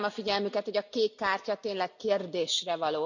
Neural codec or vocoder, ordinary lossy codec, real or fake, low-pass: none; none; real; 7.2 kHz